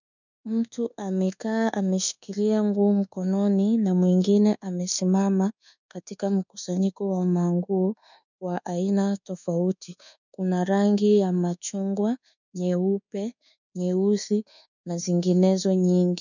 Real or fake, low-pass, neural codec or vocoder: fake; 7.2 kHz; codec, 24 kHz, 1.2 kbps, DualCodec